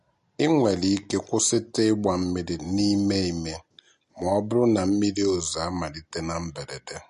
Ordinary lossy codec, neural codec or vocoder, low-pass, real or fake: MP3, 48 kbps; none; 14.4 kHz; real